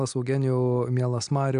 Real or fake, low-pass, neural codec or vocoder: real; 9.9 kHz; none